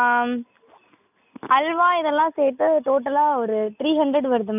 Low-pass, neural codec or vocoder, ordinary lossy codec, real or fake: 3.6 kHz; none; none; real